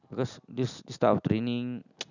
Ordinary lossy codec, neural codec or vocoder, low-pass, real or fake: none; none; 7.2 kHz; real